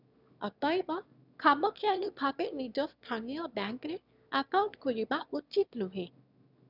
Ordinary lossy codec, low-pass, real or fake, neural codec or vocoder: none; 5.4 kHz; fake; autoencoder, 22.05 kHz, a latent of 192 numbers a frame, VITS, trained on one speaker